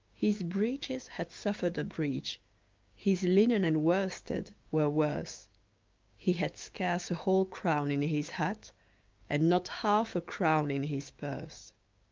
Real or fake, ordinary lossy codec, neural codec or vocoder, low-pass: fake; Opus, 24 kbps; codec, 16 kHz, 6 kbps, DAC; 7.2 kHz